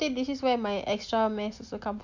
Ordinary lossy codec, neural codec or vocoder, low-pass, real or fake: MP3, 64 kbps; none; 7.2 kHz; real